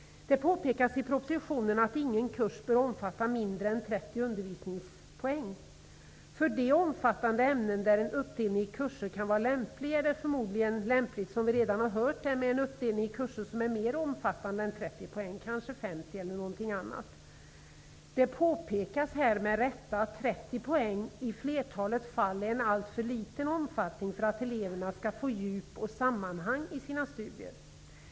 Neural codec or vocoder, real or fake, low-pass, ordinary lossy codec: none; real; none; none